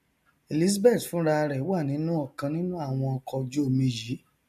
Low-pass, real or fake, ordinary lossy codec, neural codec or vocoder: 14.4 kHz; real; MP3, 64 kbps; none